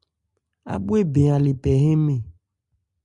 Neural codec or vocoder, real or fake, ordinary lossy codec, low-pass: none; real; Opus, 64 kbps; 10.8 kHz